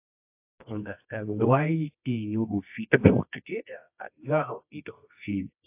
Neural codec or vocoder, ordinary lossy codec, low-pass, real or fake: codec, 24 kHz, 0.9 kbps, WavTokenizer, medium music audio release; AAC, 32 kbps; 3.6 kHz; fake